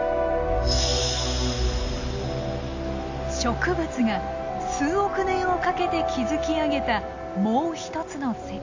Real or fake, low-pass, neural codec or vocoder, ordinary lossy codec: real; 7.2 kHz; none; AAC, 48 kbps